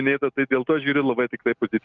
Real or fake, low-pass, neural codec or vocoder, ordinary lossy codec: real; 7.2 kHz; none; Opus, 32 kbps